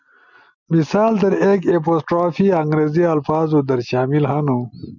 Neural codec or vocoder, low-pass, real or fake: none; 7.2 kHz; real